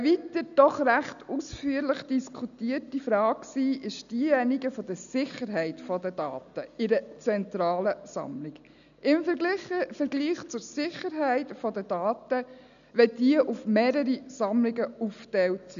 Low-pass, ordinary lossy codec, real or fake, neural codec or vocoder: 7.2 kHz; none; real; none